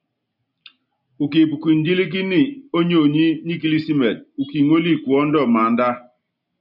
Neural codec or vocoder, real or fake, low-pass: none; real; 5.4 kHz